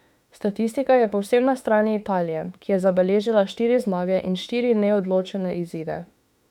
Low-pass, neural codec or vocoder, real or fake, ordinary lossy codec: 19.8 kHz; autoencoder, 48 kHz, 32 numbers a frame, DAC-VAE, trained on Japanese speech; fake; none